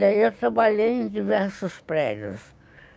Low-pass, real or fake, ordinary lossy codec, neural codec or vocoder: none; fake; none; codec, 16 kHz, 6 kbps, DAC